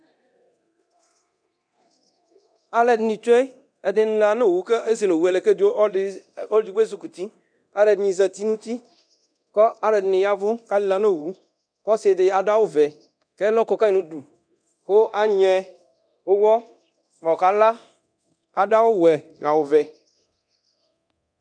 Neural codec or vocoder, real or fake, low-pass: codec, 24 kHz, 0.9 kbps, DualCodec; fake; 9.9 kHz